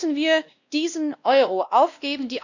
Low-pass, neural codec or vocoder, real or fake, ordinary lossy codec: 7.2 kHz; codec, 16 kHz, 1 kbps, X-Codec, WavLM features, trained on Multilingual LibriSpeech; fake; none